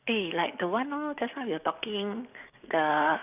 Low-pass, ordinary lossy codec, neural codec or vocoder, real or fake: 3.6 kHz; none; codec, 44.1 kHz, 7.8 kbps, DAC; fake